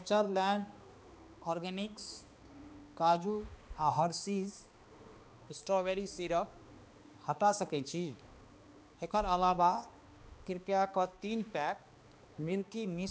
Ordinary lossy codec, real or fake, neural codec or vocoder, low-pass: none; fake; codec, 16 kHz, 2 kbps, X-Codec, HuBERT features, trained on balanced general audio; none